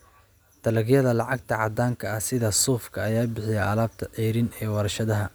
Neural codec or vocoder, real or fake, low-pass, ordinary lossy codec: none; real; none; none